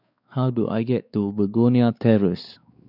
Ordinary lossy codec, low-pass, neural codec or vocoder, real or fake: none; 5.4 kHz; codec, 16 kHz, 4 kbps, X-Codec, WavLM features, trained on Multilingual LibriSpeech; fake